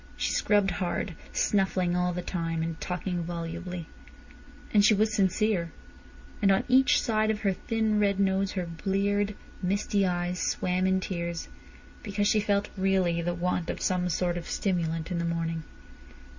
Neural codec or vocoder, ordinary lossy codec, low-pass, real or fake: none; Opus, 64 kbps; 7.2 kHz; real